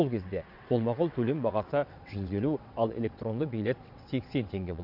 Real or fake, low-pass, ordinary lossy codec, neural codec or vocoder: fake; 5.4 kHz; none; vocoder, 22.05 kHz, 80 mel bands, WaveNeXt